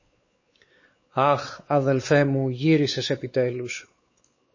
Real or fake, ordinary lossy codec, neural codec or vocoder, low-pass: fake; MP3, 32 kbps; codec, 16 kHz, 4 kbps, X-Codec, WavLM features, trained on Multilingual LibriSpeech; 7.2 kHz